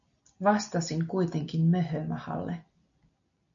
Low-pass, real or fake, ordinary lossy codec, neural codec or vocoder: 7.2 kHz; real; MP3, 96 kbps; none